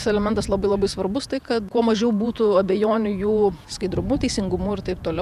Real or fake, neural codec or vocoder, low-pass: fake; vocoder, 48 kHz, 128 mel bands, Vocos; 14.4 kHz